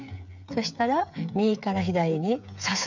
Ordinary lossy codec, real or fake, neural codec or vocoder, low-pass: none; fake; codec, 16 kHz, 8 kbps, FreqCodec, smaller model; 7.2 kHz